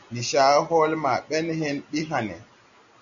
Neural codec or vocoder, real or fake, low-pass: none; real; 7.2 kHz